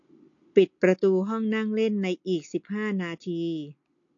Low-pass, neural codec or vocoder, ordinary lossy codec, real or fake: 7.2 kHz; none; AAC, 48 kbps; real